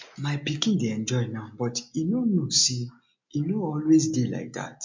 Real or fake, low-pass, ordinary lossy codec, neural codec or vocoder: real; 7.2 kHz; MP3, 48 kbps; none